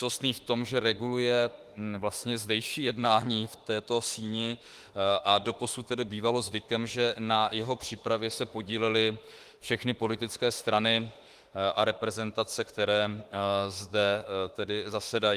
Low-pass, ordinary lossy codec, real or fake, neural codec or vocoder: 14.4 kHz; Opus, 24 kbps; fake; autoencoder, 48 kHz, 32 numbers a frame, DAC-VAE, trained on Japanese speech